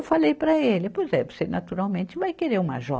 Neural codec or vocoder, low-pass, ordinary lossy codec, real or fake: none; none; none; real